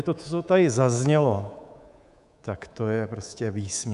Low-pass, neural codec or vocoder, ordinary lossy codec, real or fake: 10.8 kHz; codec, 24 kHz, 3.1 kbps, DualCodec; MP3, 96 kbps; fake